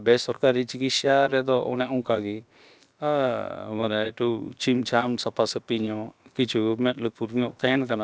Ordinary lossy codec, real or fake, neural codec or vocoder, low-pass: none; fake; codec, 16 kHz, about 1 kbps, DyCAST, with the encoder's durations; none